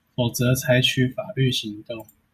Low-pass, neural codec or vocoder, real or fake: 14.4 kHz; none; real